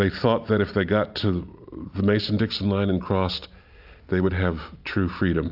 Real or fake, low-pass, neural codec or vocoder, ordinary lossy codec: real; 5.4 kHz; none; AAC, 48 kbps